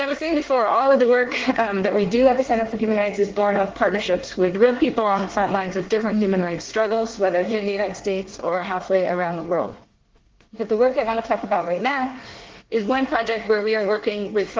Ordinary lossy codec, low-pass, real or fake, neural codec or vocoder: Opus, 16 kbps; 7.2 kHz; fake; codec, 24 kHz, 1 kbps, SNAC